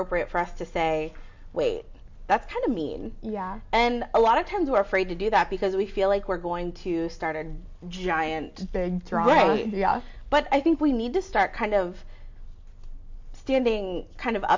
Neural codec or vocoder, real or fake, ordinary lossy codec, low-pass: none; real; MP3, 48 kbps; 7.2 kHz